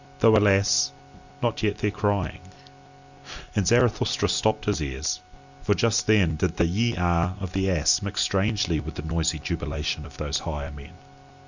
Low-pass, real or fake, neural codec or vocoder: 7.2 kHz; real; none